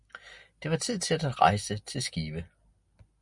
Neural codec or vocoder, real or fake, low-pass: none; real; 10.8 kHz